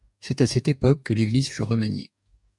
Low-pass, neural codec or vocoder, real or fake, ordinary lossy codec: 10.8 kHz; codec, 44.1 kHz, 2.6 kbps, DAC; fake; MP3, 96 kbps